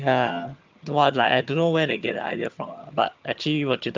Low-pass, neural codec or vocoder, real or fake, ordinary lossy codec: 7.2 kHz; vocoder, 22.05 kHz, 80 mel bands, HiFi-GAN; fake; Opus, 24 kbps